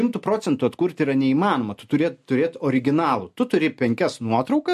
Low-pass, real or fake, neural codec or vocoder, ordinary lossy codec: 14.4 kHz; real; none; MP3, 64 kbps